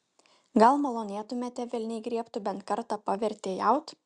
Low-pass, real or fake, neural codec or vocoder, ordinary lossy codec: 9.9 kHz; real; none; Opus, 64 kbps